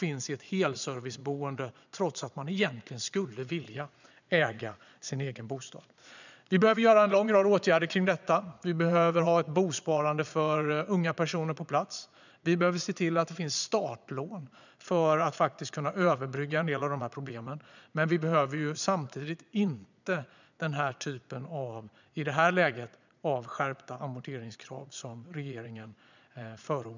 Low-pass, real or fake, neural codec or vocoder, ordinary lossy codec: 7.2 kHz; fake; vocoder, 22.05 kHz, 80 mel bands, Vocos; none